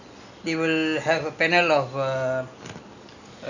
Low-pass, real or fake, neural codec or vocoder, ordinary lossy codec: 7.2 kHz; real; none; none